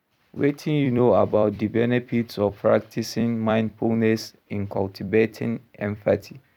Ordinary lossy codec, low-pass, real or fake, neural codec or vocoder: none; 19.8 kHz; fake; vocoder, 44.1 kHz, 128 mel bands every 256 samples, BigVGAN v2